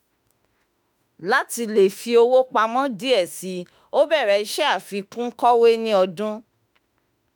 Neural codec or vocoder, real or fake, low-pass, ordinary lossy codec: autoencoder, 48 kHz, 32 numbers a frame, DAC-VAE, trained on Japanese speech; fake; none; none